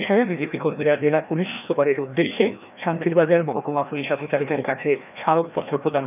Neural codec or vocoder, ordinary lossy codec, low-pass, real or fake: codec, 16 kHz, 1 kbps, FreqCodec, larger model; none; 3.6 kHz; fake